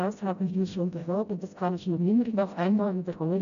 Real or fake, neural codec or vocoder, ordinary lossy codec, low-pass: fake; codec, 16 kHz, 0.5 kbps, FreqCodec, smaller model; none; 7.2 kHz